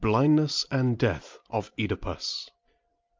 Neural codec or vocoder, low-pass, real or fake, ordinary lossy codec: none; 7.2 kHz; real; Opus, 24 kbps